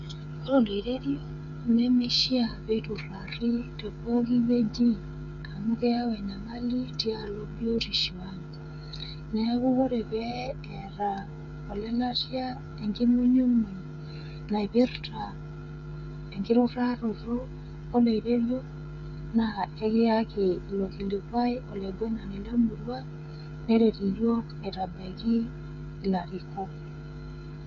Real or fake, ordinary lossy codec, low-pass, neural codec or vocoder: fake; none; 7.2 kHz; codec, 16 kHz, 8 kbps, FreqCodec, smaller model